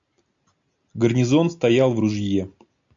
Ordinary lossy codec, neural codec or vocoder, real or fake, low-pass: AAC, 64 kbps; none; real; 7.2 kHz